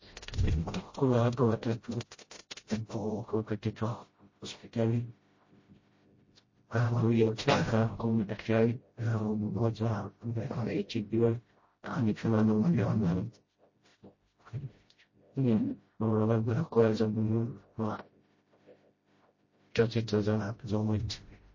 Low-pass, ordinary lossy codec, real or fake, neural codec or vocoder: 7.2 kHz; MP3, 32 kbps; fake; codec, 16 kHz, 0.5 kbps, FreqCodec, smaller model